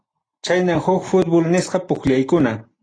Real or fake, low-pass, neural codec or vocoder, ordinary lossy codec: real; 9.9 kHz; none; AAC, 32 kbps